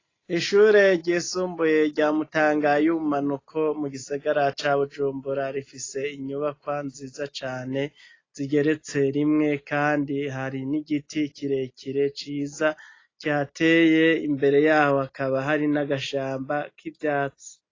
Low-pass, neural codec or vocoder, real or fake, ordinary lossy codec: 7.2 kHz; none; real; AAC, 32 kbps